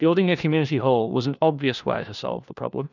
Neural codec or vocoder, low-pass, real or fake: codec, 16 kHz, 1 kbps, FunCodec, trained on LibriTTS, 50 frames a second; 7.2 kHz; fake